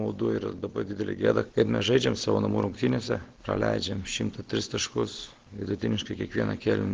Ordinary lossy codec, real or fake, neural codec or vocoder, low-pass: Opus, 16 kbps; real; none; 7.2 kHz